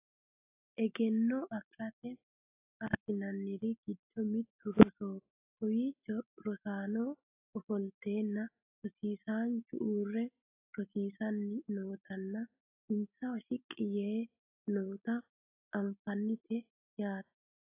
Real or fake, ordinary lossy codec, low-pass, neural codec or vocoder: real; AAC, 24 kbps; 3.6 kHz; none